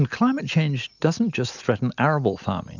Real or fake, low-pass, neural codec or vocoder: real; 7.2 kHz; none